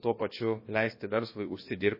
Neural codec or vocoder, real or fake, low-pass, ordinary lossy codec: codec, 16 kHz, about 1 kbps, DyCAST, with the encoder's durations; fake; 5.4 kHz; MP3, 24 kbps